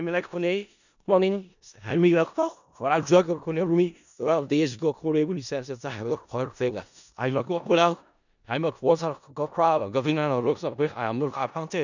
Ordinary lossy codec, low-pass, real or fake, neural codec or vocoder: none; 7.2 kHz; fake; codec, 16 kHz in and 24 kHz out, 0.4 kbps, LongCat-Audio-Codec, four codebook decoder